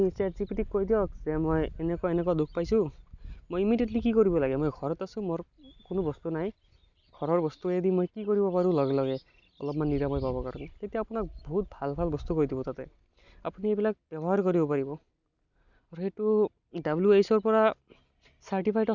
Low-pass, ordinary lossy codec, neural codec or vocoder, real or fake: 7.2 kHz; none; none; real